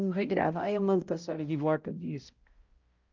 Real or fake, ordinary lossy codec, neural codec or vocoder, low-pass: fake; Opus, 32 kbps; codec, 16 kHz, 0.5 kbps, X-Codec, HuBERT features, trained on balanced general audio; 7.2 kHz